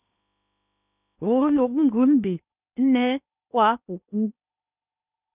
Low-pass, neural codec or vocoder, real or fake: 3.6 kHz; codec, 16 kHz in and 24 kHz out, 0.8 kbps, FocalCodec, streaming, 65536 codes; fake